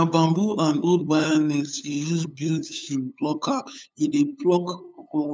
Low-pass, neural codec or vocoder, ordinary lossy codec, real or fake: none; codec, 16 kHz, 8 kbps, FunCodec, trained on LibriTTS, 25 frames a second; none; fake